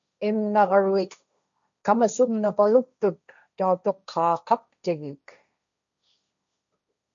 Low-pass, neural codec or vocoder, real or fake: 7.2 kHz; codec, 16 kHz, 1.1 kbps, Voila-Tokenizer; fake